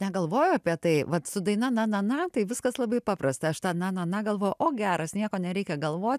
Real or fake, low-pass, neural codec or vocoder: real; 14.4 kHz; none